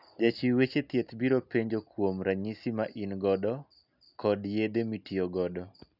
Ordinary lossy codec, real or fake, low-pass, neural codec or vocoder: none; real; 5.4 kHz; none